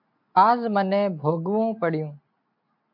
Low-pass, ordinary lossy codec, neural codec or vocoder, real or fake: 5.4 kHz; MP3, 48 kbps; none; real